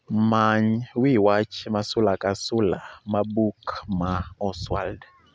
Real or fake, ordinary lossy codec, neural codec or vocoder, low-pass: real; none; none; none